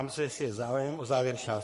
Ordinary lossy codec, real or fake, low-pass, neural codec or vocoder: MP3, 48 kbps; fake; 14.4 kHz; codec, 44.1 kHz, 3.4 kbps, Pupu-Codec